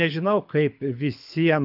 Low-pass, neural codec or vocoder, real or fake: 5.4 kHz; codec, 24 kHz, 6 kbps, HILCodec; fake